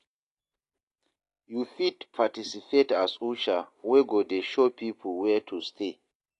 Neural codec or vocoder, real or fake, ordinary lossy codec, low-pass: none; real; AAC, 48 kbps; 10.8 kHz